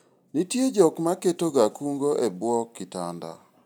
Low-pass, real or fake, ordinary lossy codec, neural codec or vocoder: none; real; none; none